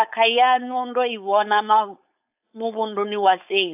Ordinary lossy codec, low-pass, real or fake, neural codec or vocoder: AAC, 32 kbps; 3.6 kHz; fake; codec, 16 kHz, 4.8 kbps, FACodec